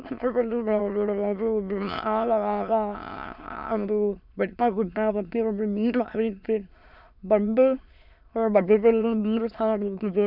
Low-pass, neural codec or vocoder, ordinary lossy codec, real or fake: 5.4 kHz; autoencoder, 22.05 kHz, a latent of 192 numbers a frame, VITS, trained on many speakers; none; fake